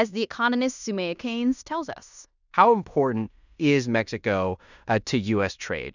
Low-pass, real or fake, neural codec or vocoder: 7.2 kHz; fake; codec, 16 kHz in and 24 kHz out, 0.9 kbps, LongCat-Audio-Codec, fine tuned four codebook decoder